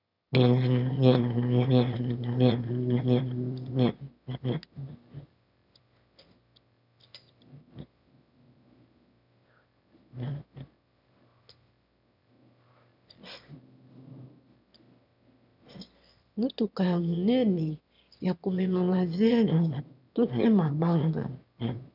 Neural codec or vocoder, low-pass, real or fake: autoencoder, 22.05 kHz, a latent of 192 numbers a frame, VITS, trained on one speaker; 5.4 kHz; fake